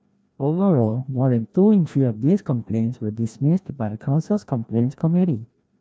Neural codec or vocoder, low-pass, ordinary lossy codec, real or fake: codec, 16 kHz, 1 kbps, FreqCodec, larger model; none; none; fake